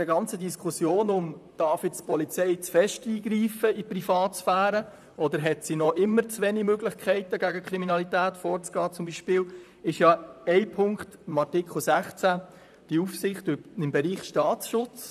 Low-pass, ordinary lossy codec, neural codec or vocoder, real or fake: 14.4 kHz; none; vocoder, 44.1 kHz, 128 mel bands, Pupu-Vocoder; fake